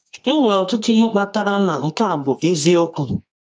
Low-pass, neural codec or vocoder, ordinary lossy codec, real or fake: 9.9 kHz; codec, 24 kHz, 0.9 kbps, WavTokenizer, medium music audio release; none; fake